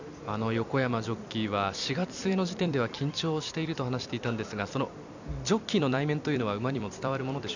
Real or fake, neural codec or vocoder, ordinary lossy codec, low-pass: fake; vocoder, 44.1 kHz, 128 mel bands every 256 samples, BigVGAN v2; none; 7.2 kHz